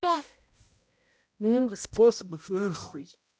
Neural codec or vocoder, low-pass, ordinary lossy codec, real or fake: codec, 16 kHz, 0.5 kbps, X-Codec, HuBERT features, trained on balanced general audio; none; none; fake